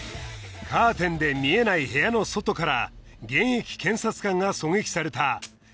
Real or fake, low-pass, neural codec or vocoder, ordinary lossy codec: real; none; none; none